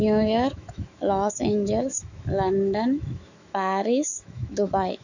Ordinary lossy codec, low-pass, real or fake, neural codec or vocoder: none; 7.2 kHz; fake; autoencoder, 48 kHz, 128 numbers a frame, DAC-VAE, trained on Japanese speech